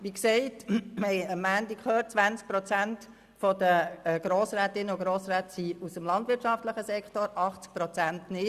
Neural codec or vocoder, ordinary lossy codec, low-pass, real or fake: none; Opus, 64 kbps; 14.4 kHz; real